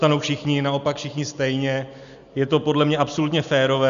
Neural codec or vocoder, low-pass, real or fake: none; 7.2 kHz; real